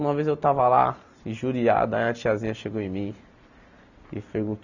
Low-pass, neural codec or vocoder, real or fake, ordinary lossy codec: 7.2 kHz; none; real; none